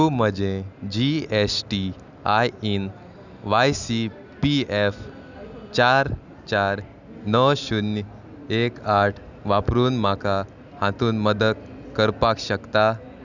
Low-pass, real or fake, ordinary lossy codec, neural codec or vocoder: 7.2 kHz; real; none; none